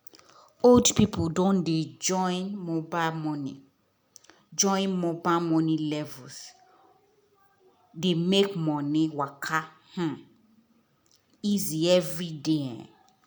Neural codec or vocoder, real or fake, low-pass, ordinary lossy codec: none; real; none; none